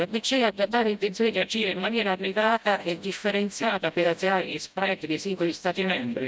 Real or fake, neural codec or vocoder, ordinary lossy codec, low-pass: fake; codec, 16 kHz, 0.5 kbps, FreqCodec, smaller model; none; none